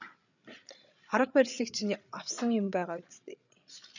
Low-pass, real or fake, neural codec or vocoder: 7.2 kHz; fake; codec, 16 kHz, 8 kbps, FreqCodec, larger model